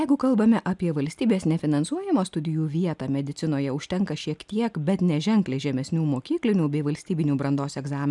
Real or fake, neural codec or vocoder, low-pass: real; none; 10.8 kHz